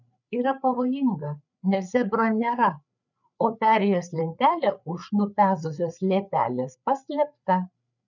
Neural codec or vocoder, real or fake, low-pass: codec, 16 kHz, 8 kbps, FreqCodec, larger model; fake; 7.2 kHz